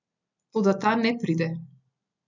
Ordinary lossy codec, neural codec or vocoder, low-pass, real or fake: none; none; 7.2 kHz; real